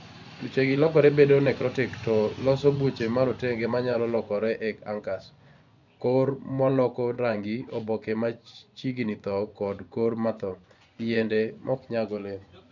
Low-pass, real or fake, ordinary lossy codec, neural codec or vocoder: 7.2 kHz; fake; none; vocoder, 24 kHz, 100 mel bands, Vocos